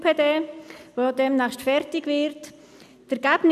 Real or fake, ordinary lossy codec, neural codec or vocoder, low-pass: real; none; none; 14.4 kHz